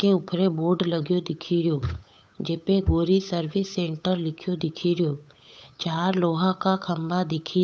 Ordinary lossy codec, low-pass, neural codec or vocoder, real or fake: none; none; codec, 16 kHz, 8 kbps, FunCodec, trained on Chinese and English, 25 frames a second; fake